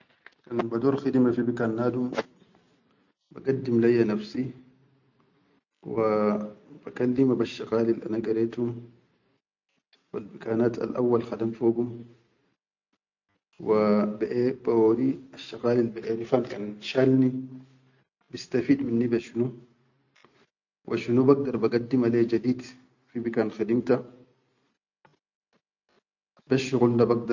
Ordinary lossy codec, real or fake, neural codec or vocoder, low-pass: none; real; none; 7.2 kHz